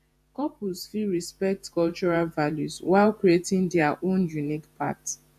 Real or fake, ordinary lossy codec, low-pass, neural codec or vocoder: fake; AAC, 96 kbps; 14.4 kHz; vocoder, 48 kHz, 128 mel bands, Vocos